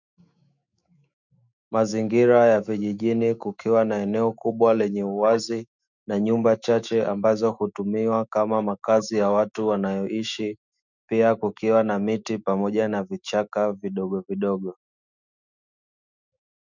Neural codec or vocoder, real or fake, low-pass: autoencoder, 48 kHz, 128 numbers a frame, DAC-VAE, trained on Japanese speech; fake; 7.2 kHz